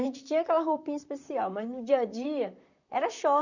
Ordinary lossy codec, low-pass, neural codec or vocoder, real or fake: none; 7.2 kHz; vocoder, 44.1 kHz, 128 mel bands, Pupu-Vocoder; fake